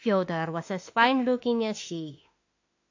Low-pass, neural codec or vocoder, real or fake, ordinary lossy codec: 7.2 kHz; autoencoder, 48 kHz, 32 numbers a frame, DAC-VAE, trained on Japanese speech; fake; AAC, 48 kbps